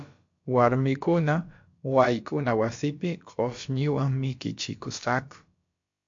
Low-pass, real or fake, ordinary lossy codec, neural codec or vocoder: 7.2 kHz; fake; MP3, 48 kbps; codec, 16 kHz, about 1 kbps, DyCAST, with the encoder's durations